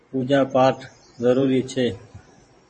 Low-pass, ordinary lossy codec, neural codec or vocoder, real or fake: 10.8 kHz; MP3, 32 kbps; vocoder, 44.1 kHz, 128 mel bands every 512 samples, BigVGAN v2; fake